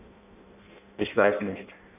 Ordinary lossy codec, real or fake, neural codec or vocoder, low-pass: none; fake; codec, 16 kHz in and 24 kHz out, 0.6 kbps, FireRedTTS-2 codec; 3.6 kHz